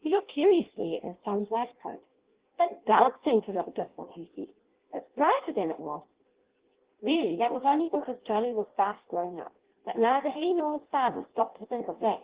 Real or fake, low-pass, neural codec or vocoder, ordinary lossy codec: fake; 3.6 kHz; codec, 16 kHz in and 24 kHz out, 0.6 kbps, FireRedTTS-2 codec; Opus, 16 kbps